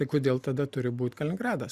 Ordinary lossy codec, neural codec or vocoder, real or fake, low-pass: Opus, 64 kbps; none; real; 14.4 kHz